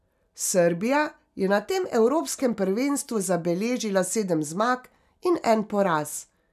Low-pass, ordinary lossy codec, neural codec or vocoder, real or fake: 14.4 kHz; none; none; real